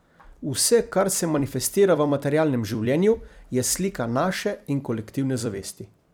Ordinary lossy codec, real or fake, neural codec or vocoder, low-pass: none; fake; vocoder, 44.1 kHz, 128 mel bands every 256 samples, BigVGAN v2; none